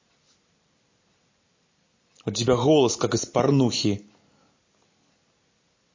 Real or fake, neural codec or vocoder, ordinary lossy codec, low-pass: real; none; MP3, 32 kbps; 7.2 kHz